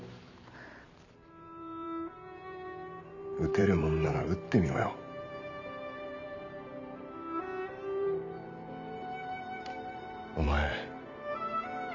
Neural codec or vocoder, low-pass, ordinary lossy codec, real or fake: vocoder, 44.1 kHz, 128 mel bands every 512 samples, BigVGAN v2; 7.2 kHz; none; fake